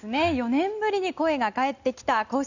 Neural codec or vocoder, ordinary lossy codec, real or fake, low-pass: none; none; real; 7.2 kHz